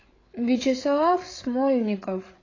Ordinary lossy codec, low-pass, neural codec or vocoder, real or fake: AAC, 32 kbps; 7.2 kHz; codec, 16 kHz, 8 kbps, FreqCodec, larger model; fake